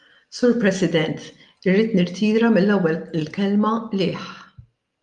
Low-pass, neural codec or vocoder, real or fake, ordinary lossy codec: 10.8 kHz; none; real; Opus, 32 kbps